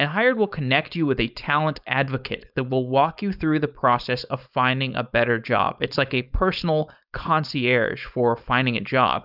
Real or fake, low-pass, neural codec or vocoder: fake; 5.4 kHz; codec, 16 kHz, 4.8 kbps, FACodec